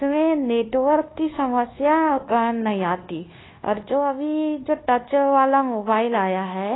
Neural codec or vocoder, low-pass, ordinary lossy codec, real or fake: codec, 24 kHz, 1.2 kbps, DualCodec; 7.2 kHz; AAC, 16 kbps; fake